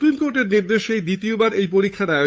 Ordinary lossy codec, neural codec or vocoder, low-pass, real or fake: none; codec, 16 kHz, 8 kbps, FunCodec, trained on Chinese and English, 25 frames a second; none; fake